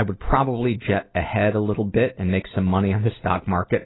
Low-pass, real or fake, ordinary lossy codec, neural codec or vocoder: 7.2 kHz; real; AAC, 16 kbps; none